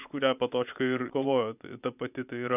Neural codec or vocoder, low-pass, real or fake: vocoder, 24 kHz, 100 mel bands, Vocos; 3.6 kHz; fake